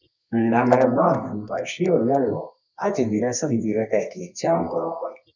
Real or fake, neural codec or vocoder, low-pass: fake; codec, 24 kHz, 0.9 kbps, WavTokenizer, medium music audio release; 7.2 kHz